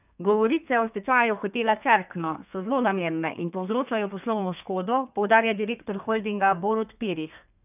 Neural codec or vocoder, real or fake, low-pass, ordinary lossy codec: codec, 32 kHz, 1.9 kbps, SNAC; fake; 3.6 kHz; none